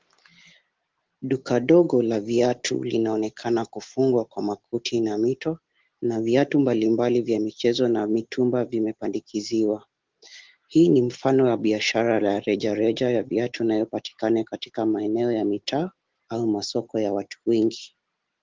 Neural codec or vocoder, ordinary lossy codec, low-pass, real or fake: none; Opus, 16 kbps; 7.2 kHz; real